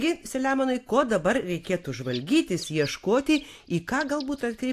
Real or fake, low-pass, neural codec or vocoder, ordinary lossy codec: real; 14.4 kHz; none; AAC, 64 kbps